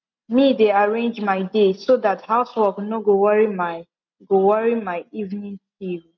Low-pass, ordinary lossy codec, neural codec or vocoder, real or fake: 7.2 kHz; MP3, 64 kbps; none; real